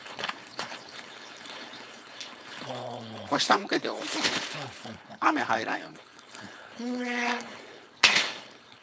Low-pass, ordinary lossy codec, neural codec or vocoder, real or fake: none; none; codec, 16 kHz, 4.8 kbps, FACodec; fake